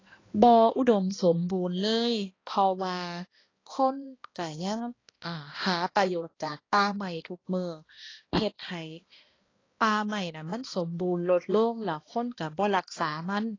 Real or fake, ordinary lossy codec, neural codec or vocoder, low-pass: fake; AAC, 32 kbps; codec, 16 kHz, 1 kbps, X-Codec, HuBERT features, trained on balanced general audio; 7.2 kHz